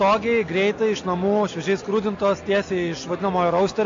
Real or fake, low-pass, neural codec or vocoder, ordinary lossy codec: real; 7.2 kHz; none; AAC, 48 kbps